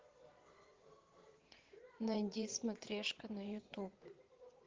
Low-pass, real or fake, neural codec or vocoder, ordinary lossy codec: 7.2 kHz; fake; vocoder, 22.05 kHz, 80 mel bands, Vocos; Opus, 16 kbps